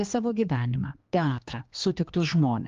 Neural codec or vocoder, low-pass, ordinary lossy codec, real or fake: codec, 16 kHz, 2 kbps, X-Codec, HuBERT features, trained on general audio; 7.2 kHz; Opus, 32 kbps; fake